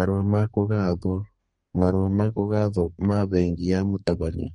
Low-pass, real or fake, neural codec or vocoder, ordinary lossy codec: 14.4 kHz; fake; codec, 32 kHz, 1.9 kbps, SNAC; MP3, 48 kbps